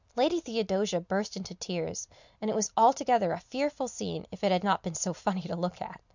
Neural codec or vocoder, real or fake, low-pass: none; real; 7.2 kHz